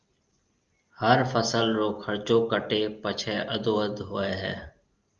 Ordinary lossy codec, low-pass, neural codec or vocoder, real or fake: Opus, 32 kbps; 7.2 kHz; none; real